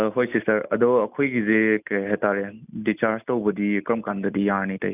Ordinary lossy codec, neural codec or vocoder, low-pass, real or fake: none; none; 3.6 kHz; real